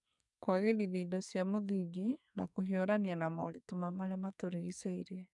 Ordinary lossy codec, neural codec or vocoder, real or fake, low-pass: none; codec, 44.1 kHz, 2.6 kbps, SNAC; fake; 10.8 kHz